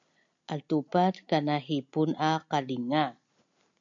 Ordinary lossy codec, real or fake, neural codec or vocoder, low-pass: MP3, 96 kbps; real; none; 7.2 kHz